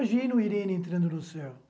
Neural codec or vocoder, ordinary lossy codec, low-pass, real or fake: none; none; none; real